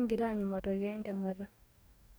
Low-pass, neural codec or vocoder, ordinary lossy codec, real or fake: none; codec, 44.1 kHz, 2.6 kbps, DAC; none; fake